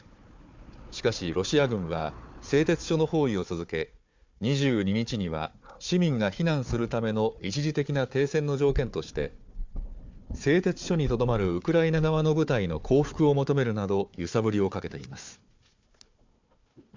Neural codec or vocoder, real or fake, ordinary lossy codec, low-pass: codec, 16 kHz, 4 kbps, FunCodec, trained on Chinese and English, 50 frames a second; fake; MP3, 64 kbps; 7.2 kHz